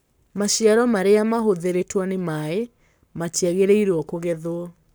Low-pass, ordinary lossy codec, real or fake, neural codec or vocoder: none; none; fake; codec, 44.1 kHz, 7.8 kbps, Pupu-Codec